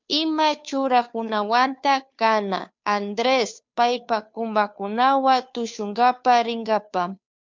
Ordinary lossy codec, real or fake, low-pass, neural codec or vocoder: MP3, 64 kbps; fake; 7.2 kHz; codec, 16 kHz, 2 kbps, FunCodec, trained on Chinese and English, 25 frames a second